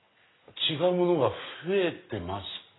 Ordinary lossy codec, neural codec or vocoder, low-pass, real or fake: AAC, 16 kbps; none; 7.2 kHz; real